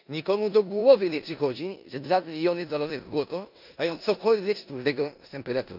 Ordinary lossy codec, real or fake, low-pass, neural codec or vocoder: none; fake; 5.4 kHz; codec, 16 kHz in and 24 kHz out, 0.9 kbps, LongCat-Audio-Codec, four codebook decoder